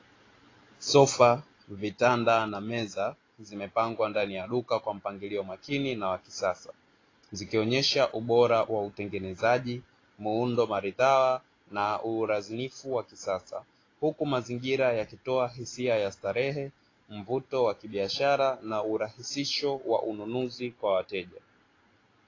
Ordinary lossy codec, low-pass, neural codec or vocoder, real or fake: AAC, 32 kbps; 7.2 kHz; none; real